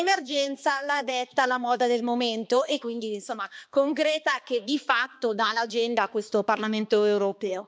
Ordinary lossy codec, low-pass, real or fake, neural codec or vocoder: none; none; fake; codec, 16 kHz, 2 kbps, X-Codec, HuBERT features, trained on balanced general audio